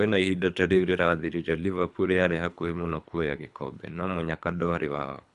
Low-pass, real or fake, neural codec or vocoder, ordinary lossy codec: 10.8 kHz; fake; codec, 24 kHz, 3 kbps, HILCodec; none